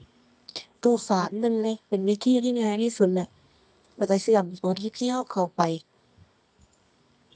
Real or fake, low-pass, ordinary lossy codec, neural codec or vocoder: fake; 9.9 kHz; none; codec, 24 kHz, 0.9 kbps, WavTokenizer, medium music audio release